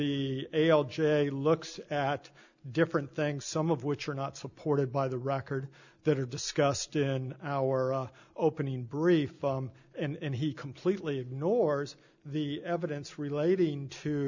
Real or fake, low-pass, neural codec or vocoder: real; 7.2 kHz; none